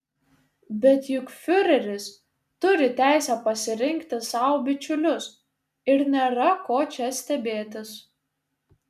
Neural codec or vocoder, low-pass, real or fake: none; 14.4 kHz; real